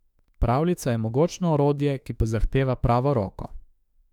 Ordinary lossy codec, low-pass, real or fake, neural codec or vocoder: none; 19.8 kHz; fake; autoencoder, 48 kHz, 32 numbers a frame, DAC-VAE, trained on Japanese speech